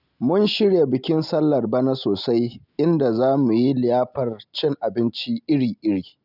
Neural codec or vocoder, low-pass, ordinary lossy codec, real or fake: none; 5.4 kHz; none; real